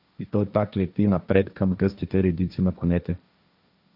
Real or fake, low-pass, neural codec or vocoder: fake; 5.4 kHz; codec, 16 kHz, 1.1 kbps, Voila-Tokenizer